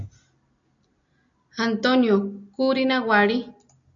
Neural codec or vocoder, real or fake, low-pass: none; real; 7.2 kHz